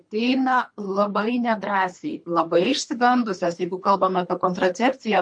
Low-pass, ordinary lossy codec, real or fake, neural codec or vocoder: 9.9 kHz; MP3, 48 kbps; fake; codec, 24 kHz, 3 kbps, HILCodec